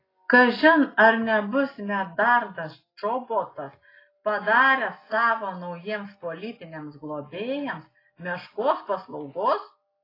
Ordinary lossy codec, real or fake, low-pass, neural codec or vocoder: AAC, 24 kbps; real; 5.4 kHz; none